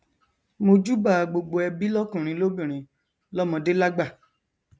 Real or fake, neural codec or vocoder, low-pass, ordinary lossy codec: real; none; none; none